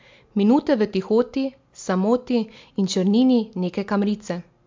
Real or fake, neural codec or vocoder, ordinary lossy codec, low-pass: real; none; MP3, 64 kbps; 7.2 kHz